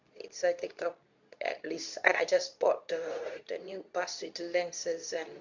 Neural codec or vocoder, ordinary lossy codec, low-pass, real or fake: codec, 24 kHz, 0.9 kbps, WavTokenizer, medium speech release version 2; none; 7.2 kHz; fake